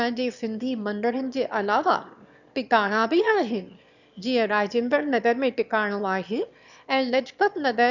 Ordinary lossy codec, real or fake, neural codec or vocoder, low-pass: none; fake; autoencoder, 22.05 kHz, a latent of 192 numbers a frame, VITS, trained on one speaker; 7.2 kHz